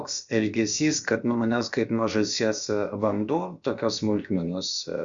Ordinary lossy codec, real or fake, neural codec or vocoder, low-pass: Opus, 64 kbps; fake; codec, 16 kHz, about 1 kbps, DyCAST, with the encoder's durations; 7.2 kHz